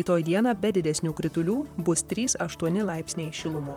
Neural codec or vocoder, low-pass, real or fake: vocoder, 44.1 kHz, 128 mel bands, Pupu-Vocoder; 19.8 kHz; fake